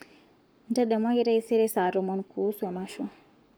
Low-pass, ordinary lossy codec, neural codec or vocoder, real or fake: none; none; codec, 44.1 kHz, 7.8 kbps, Pupu-Codec; fake